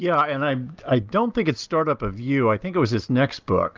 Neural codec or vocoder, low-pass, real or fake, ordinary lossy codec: none; 7.2 kHz; real; Opus, 24 kbps